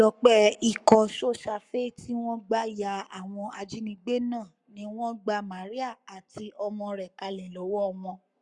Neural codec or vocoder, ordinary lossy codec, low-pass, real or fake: codec, 44.1 kHz, 7.8 kbps, Pupu-Codec; none; 10.8 kHz; fake